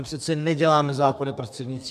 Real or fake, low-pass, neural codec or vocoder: fake; 14.4 kHz; codec, 32 kHz, 1.9 kbps, SNAC